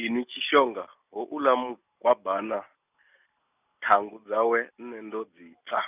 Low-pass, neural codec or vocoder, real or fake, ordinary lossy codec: 3.6 kHz; none; real; none